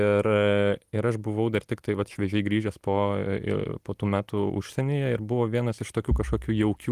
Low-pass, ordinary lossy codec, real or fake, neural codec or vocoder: 14.4 kHz; Opus, 24 kbps; real; none